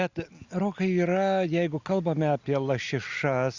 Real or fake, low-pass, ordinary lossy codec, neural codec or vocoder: real; 7.2 kHz; Opus, 64 kbps; none